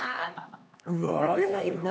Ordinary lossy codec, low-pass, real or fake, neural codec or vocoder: none; none; fake; codec, 16 kHz, 2 kbps, X-Codec, HuBERT features, trained on LibriSpeech